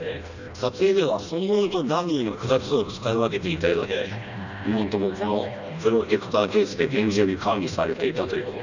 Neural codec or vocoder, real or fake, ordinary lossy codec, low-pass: codec, 16 kHz, 1 kbps, FreqCodec, smaller model; fake; none; 7.2 kHz